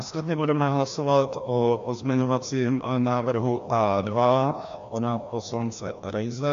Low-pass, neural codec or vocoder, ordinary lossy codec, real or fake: 7.2 kHz; codec, 16 kHz, 1 kbps, FreqCodec, larger model; AAC, 64 kbps; fake